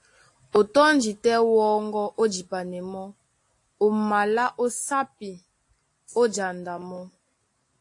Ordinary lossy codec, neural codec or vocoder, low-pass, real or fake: AAC, 48 kbps; none; 10.8 kHz; real